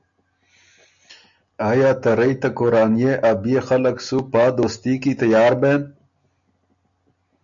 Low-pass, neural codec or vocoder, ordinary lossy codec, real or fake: 7.2 kHz; none; MP3, 64 kbps; real